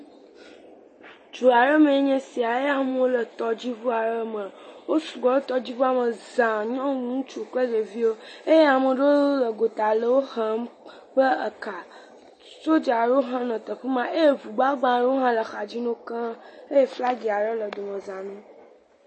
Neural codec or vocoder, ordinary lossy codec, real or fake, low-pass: none; MP3, 32 kbps; real; 10.8 kHz